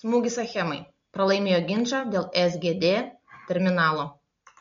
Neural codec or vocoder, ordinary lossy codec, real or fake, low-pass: none; MP3, 48 kbps; real; 7.2 kHz